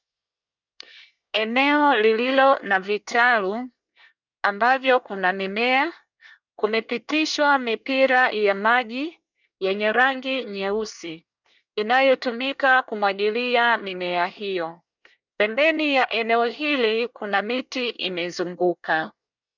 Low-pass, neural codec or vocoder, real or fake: 7.2 kHz; codec, 24 kHz, 1 kbps, SNAC; fake